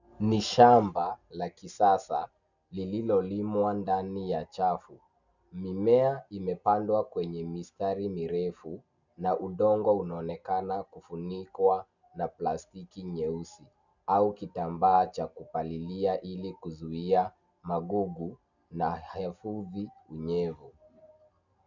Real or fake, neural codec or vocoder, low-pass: real; none; 7.2 kHz